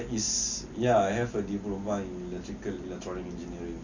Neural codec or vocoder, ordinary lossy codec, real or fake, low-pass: none; none; real; 7.2 kHz